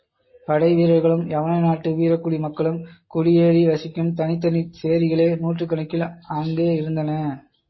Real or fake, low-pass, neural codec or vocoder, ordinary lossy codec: real; 7.2 kHz; none; MP3, 24 kbps